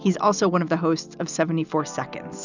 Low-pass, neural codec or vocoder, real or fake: 7.2 kHz; none; real